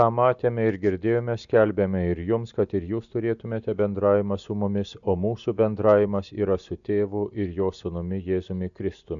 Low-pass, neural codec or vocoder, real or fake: 7.2 kHz; none; real